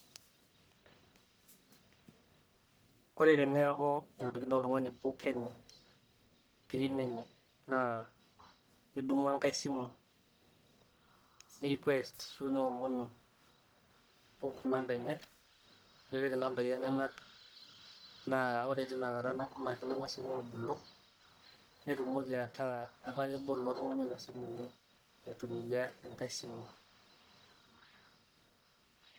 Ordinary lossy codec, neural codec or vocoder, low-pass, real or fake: none; codec, 44.1 kHz, 1.7 kbps, Pupu-Codec; none; fake